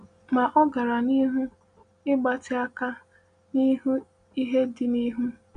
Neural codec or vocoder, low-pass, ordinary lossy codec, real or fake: none; 9.9 kHz; none; real